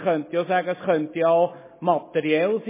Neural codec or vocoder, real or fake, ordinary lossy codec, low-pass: none; real; MP3, 16 kbps; 3.6 kHz